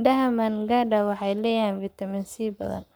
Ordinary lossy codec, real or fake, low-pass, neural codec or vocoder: none; fake; none; codec, 44.1 kHz, 7.8 kbps, Pupu-Codec